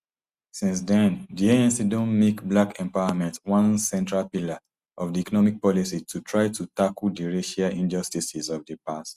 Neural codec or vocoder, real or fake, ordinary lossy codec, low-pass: none; real; Opus, 64 kbps; 14.4 kHz